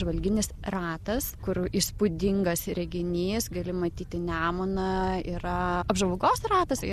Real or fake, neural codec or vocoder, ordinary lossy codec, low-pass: real; none; Opus, 64 kbps; 14.4 kHz